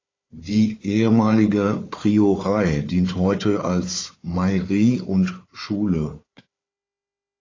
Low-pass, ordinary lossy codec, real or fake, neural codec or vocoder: 7.2 kHz; AAC, 32 kbps; fake; codec, 16 kHz, 4 kbps, FunCodec, trained on Chinese and English, 50 frames a second